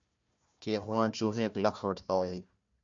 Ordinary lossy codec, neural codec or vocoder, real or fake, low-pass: MP3, 48 kbps; codec, 16 kHz, 1 kbps, FunCodec, trained on Chinese and English, 50 frames a second; fake; 7.2 kHz